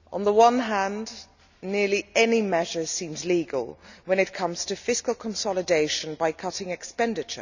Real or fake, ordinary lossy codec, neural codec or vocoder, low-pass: real; none; none; 7.2 kHz